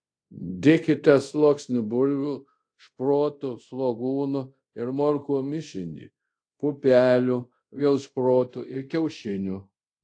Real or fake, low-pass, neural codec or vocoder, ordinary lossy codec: fake; 9.9 kHz; codec, 24 kHz, 0.5 kbps, DualCodec; AAC, 48 kbps